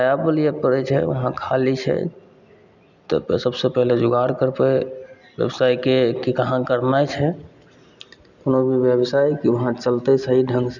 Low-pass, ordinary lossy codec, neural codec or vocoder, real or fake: 7.2 kHz; none; none; real